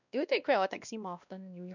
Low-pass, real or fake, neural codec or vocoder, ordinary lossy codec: 7.2 kHz; fake; codec, 16 kHz, 1 kbps, X-Codec, WavLM features, trained on Multilingual LibriSpeech; none